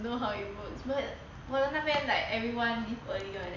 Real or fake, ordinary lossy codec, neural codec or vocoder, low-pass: real; none; none; 7.2 kHz